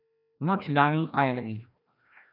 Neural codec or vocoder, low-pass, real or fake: codec, 16 kHz, 1 kbps, FreqCodec, larger model; 5.4 kHz; fake